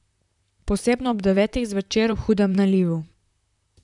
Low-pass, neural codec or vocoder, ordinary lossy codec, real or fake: 10.8 kHz; vocoder, 44.1 kHz, 128 mel bands, Pupu-Vocoder; none; fake